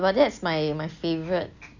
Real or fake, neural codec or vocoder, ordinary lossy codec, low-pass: real; none; none; 7.2 kHz